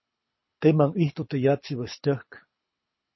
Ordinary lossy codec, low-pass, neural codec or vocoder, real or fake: MP3, 24 kbps; 7.2 kHz; codec, 24 kHz, 6 kbps, HILCodec; fake